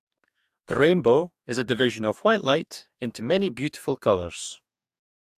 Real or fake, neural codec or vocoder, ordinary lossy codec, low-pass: fake; codec, 44.1 kHz, 2.6 kbps, DAC; AAC, 96 kbps; 14.4 kHz